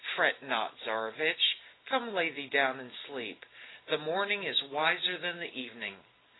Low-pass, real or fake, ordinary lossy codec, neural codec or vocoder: 7.2 kHz; real; AAC, 16 kbps; none